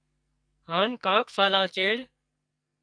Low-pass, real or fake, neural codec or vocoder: 9.9 kHz; fake; codec, 44.1 kHz, 2.6 kbps, SNAC